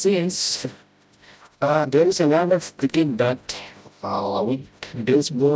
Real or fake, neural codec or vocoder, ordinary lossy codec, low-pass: fake; codec, 16 kHz, 0.5 kbps, FreqCodec, smaller model; none; none